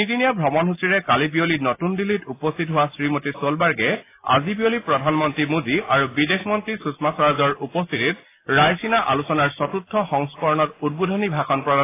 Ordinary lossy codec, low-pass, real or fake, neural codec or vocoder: AAC, 24 kbps; 3.6 kHz; real; none